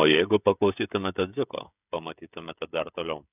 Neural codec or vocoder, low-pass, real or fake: codec, 16 kHz, 16 kbps, FreqCodec, smaller model; 3.6 kHz; fake